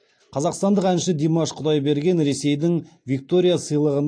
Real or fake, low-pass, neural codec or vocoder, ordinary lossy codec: real; 9.9 kHz; none; AAC, 48 kbps